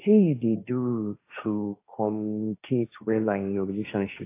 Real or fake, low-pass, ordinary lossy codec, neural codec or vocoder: fake; 3.6 kHz; AAC, 24 kbps; codec, 16 kHz, 1.1 kbps, Voila-Tokenizer